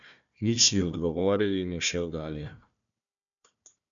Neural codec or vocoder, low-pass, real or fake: codec, 16 kHz, 1 kbps, FunCodec, trained on Chinese and English, 50 frames a second; 7.2 kHz; fake